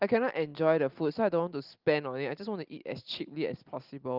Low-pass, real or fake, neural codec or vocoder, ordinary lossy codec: 5.4 kHz; real; none; Opus, 32 kbps